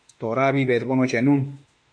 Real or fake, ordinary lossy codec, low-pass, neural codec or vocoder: fake; MP3, 48 kbps; 9.9 kHz; autoencoder, 48 kHz, 32 numbers a frame, DAC-VAE, trained on Japanese speech